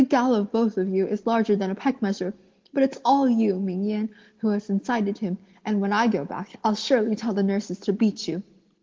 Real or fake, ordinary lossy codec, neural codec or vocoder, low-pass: real; Opus, 16 kbps; none; 7.2 kHz